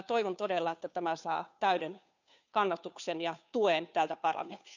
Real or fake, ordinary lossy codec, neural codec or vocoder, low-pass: fake; none; codec, 16 kHz, 2 kbps, FunCodec, trained on Chinese and English, 25 frames a second; 7.2 kHz